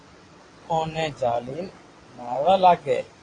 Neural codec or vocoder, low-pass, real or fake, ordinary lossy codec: vocoder, 22.05 kHz, 80 mel bands, WaveNeXt; 9.9 kHz; fake; AAC, 32 kbps